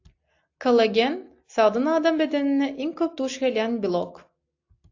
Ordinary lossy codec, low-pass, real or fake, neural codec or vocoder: AAC, 48 kbps; 7.2 kHz; real; none